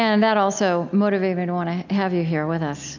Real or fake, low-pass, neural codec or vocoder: real; 7.2 kHz; none